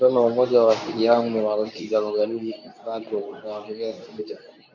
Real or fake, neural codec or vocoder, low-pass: fake; codec, 24 kHz, 0.9 kbps, WavTokenizer, medium speech release version 2; 7.2 kHz